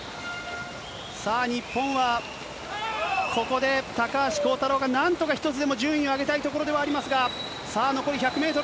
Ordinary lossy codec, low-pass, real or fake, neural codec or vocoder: none; none; real; none